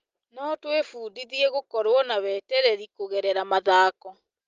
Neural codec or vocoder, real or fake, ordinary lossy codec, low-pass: none; real; Opus, 32 kbps; 7.2 kHz